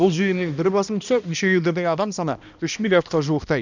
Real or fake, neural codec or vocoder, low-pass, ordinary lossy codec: fake; codec, 16 kHz, 1 kbps, X-Codec, HuBERT features, trained on balanced general audio; 7.2 kHz; none